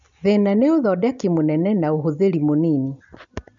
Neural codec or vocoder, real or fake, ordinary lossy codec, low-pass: none; real; MP3, 96 kbps; 7.2 kHz